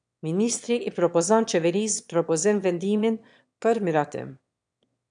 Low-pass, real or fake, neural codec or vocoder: 9.9 kHz; fake; autoencoder, 22.05 kHz, a latent of 192 numbers a frame, VITS, trained on one speaker